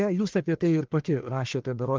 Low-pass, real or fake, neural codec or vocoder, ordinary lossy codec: 7.2 kHz; fake; codec, 44.1 kHz, 1.7 kbps, Pupu-Codec; Opus, 32 kbps